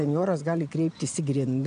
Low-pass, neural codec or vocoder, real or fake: 9.9 kHz; none; real